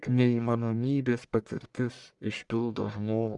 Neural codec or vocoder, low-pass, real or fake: codec, 44.1 kHz, 1.7 kbps, Pupu-Codec; 10.8 kHz; fake